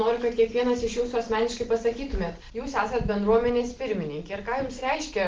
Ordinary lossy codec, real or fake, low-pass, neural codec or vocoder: Opus, 16 kbps; real; 7.2 kHz; none